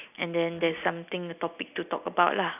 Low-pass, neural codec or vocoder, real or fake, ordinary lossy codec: 3.6 kHz; none; real; none